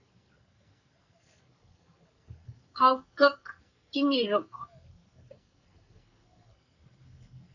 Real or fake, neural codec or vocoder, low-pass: fake; codec, 44.1 kHz, 2.6 kbps, SNAC; 7.2 kHz